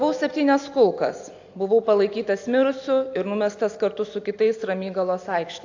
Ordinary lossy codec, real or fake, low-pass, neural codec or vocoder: AAC, 48 kbps; real; 7.2 kHz; none